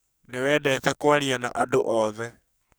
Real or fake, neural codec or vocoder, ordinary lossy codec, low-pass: fake; codec, 44.1 kHz, 2.6 kbps, SNAC; none; none